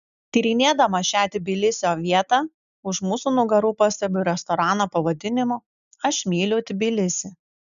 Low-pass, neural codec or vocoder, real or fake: 7.2 kHz; none; real